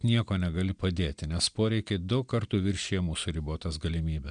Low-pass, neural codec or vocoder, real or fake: 9.9 kHz; none; real